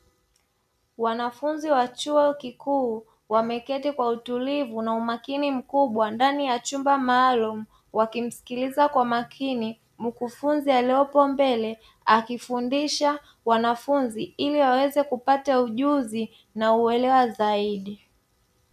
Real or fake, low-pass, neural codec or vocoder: real; 14.4 kHz; none